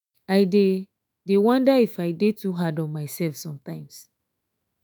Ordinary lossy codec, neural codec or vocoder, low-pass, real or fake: none; autoencoder, 48 kHz, 128 numbers a frame, DAC-VAE, trained on Japanese speech; none; fake